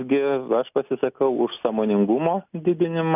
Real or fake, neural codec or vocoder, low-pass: real; none; 3.6 kHz